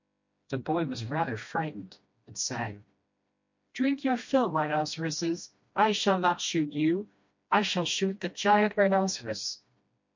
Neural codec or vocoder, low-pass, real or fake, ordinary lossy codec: codec, 16 kHz, 1 kbps, FreqCodec, smaller model; 7.2 kHz; fake; MP3, 48 kbps